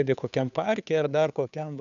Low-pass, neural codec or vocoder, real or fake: 7.2 kHz; codec, 16 kHz, 2 kbps, FunCodec, trained on Chinese and English, 25 frames a second; fake